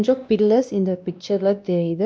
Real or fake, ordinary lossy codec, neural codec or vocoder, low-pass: fake; none; codec, 16 kHz, 1 kbps, X-Codec, WavLM features, trained on Multilingual LibriSpeech; none